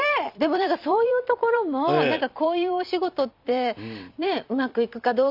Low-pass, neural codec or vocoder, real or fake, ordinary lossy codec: 5.4 kHz; vocoder, 44.1 kHz, 128 mel bands every 256 samples, BigVGAN v2; fake; none